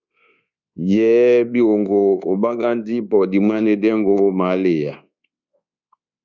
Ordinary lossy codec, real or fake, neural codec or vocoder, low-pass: Opus, 64 kbps; fake; codec, 24 kHz, 1.2 kbps, DualCodec; 7.2 kHz